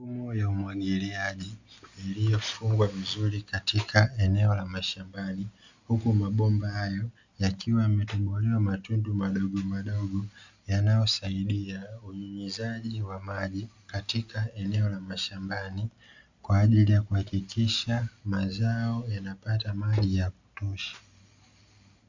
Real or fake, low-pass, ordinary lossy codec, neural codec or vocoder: real; 7.2 kHz; Opus, 64 kbps; none